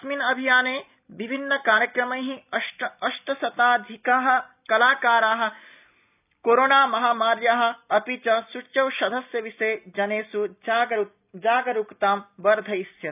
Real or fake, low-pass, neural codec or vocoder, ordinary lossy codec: real; 3.6 kHz; none; none